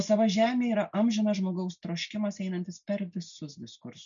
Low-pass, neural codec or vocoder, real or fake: 7.2 kHz; none; real